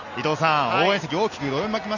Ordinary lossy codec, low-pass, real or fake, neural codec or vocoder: none; 7.2 kHz; real; none